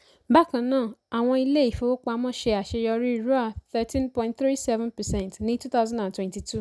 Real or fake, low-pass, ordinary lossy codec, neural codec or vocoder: real; none; none; none